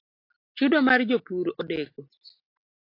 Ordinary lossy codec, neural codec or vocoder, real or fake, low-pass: AAC, 32 kbps; none; real; 5.4 kHz